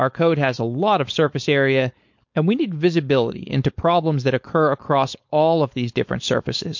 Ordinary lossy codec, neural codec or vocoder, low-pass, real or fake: MP3, 48 kbps; codec, 16 kHz, 4.8 kbps, FACodec; 7.2 kHz; fake